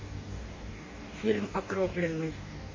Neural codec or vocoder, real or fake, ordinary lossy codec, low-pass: codec, 44.1 kHz, 2.6 kbps, DAC; fake; MP3, 32 kbps; 7.2 kHz